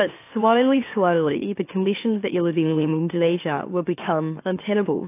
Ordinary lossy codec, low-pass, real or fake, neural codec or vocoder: AAC, 24 kbps; 3.6 kHz; fake; autoencoder, 44.1 kHz, a latent of 192 numbers a frame, MeloTTS